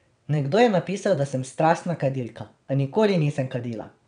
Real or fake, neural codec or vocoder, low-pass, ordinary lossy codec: fake; vocoder, 22.05 kHz, 80 mel bands, WaveNeXt; 9.9 kHz; none